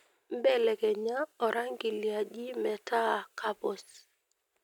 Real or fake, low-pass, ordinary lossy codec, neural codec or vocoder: real; 19.8 kHz; none; none